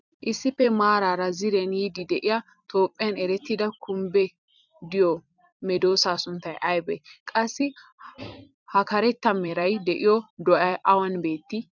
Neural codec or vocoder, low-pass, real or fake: vocoder, 44.1 kHz, 128 mel bands every 256 samples, BigVGAN v2; 7.2 kHz; fake